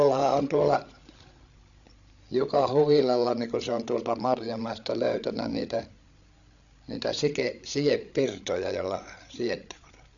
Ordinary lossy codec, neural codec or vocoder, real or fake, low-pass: none; codec, 16 kHz, 8 kbps, FreqCodec, larger model; fake; 7.2 kHz